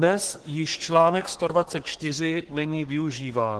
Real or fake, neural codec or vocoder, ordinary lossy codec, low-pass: fake; codec, 24 kHz, 1 kbps, SNAC; Opus, 16 kbps; 10.8 kHz